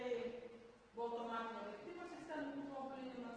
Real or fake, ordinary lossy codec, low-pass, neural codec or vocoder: real; Opus, 16 kbps; 9.9 kHz; none